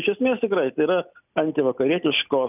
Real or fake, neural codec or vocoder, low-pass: real; none; 3.6 kHz